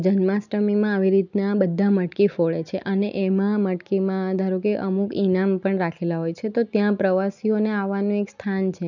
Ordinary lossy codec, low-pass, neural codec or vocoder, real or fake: none; 7.2 kHz; none; real